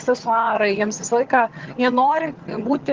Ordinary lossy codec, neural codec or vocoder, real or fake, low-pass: Opus, 16 kbps; vocoder, 22.05 kHz, 80 mel bands, HiFi-GAN; fake; 7.2 kHz